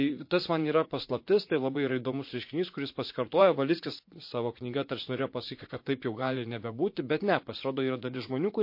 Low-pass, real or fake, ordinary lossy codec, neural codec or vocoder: 5.4 kHz; fake; MP3, 32 kbps; vocoder, 44.1 kHz, 80 mel bands, Vocos